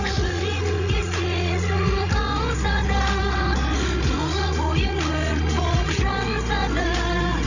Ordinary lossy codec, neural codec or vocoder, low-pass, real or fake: none; codec, 16 kHz, 16 kbps, FreqCodec, larger model; 7.2 kHz; fake